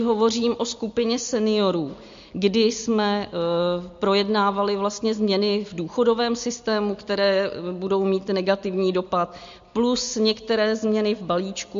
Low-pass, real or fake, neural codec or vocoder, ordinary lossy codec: 7.2 kHz; real; none; MP3, 48 kbps